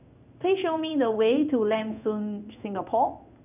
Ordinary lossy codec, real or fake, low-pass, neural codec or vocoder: none; fake; 3.6 kHz; codec, 16 kHz in and 24 kHz out, 1 kbps, XY-Tokenizer